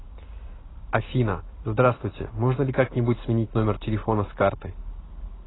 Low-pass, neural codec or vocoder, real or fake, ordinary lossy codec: 7.2 kHz; none; real; AAC, 16 kbps